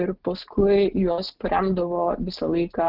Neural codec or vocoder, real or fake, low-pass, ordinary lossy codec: none; real; 5.4 kHz; Opus, 16 kbps